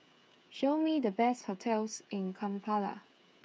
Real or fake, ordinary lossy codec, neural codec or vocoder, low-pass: fake; none; codec, 16 kHz, 8 kbps, FreqCodec, smaller model; none